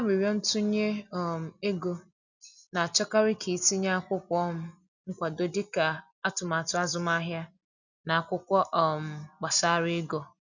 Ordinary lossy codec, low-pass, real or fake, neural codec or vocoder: none; 7.2 kHz; real; none